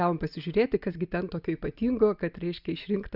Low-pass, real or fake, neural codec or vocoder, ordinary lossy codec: 5.4 kHz; real; none; Opus, 64 kbps